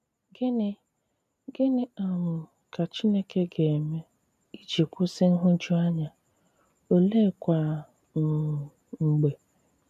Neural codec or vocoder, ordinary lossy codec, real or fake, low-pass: none; none; real; 14.4 kHz